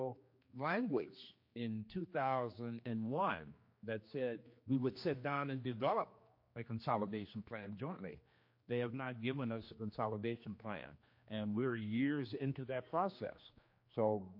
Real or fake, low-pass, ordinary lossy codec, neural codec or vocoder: fake; 5.4 kHz; MP3, 32 kbps; codec, 16 kHz, 2 kbps, X-Codec, HuBERT features, trained on general audio